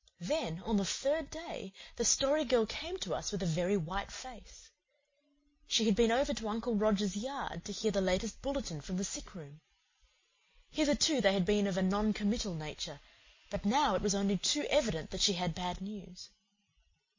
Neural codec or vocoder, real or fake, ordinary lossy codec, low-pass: none; real; MP3, 32 kbps; 7.2 kHz